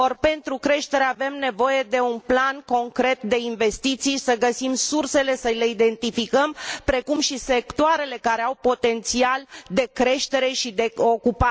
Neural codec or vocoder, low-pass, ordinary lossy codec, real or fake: none; none; none; real